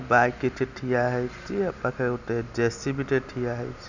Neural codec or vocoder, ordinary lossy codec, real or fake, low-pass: none; none; real; 7.2 kHz